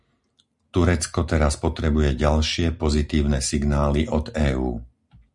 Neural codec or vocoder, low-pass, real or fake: none; 10.8 kHz; real